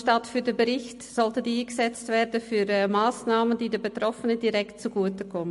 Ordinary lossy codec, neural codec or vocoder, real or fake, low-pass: MP3, 48 kbps; none; real; 14.4 kHz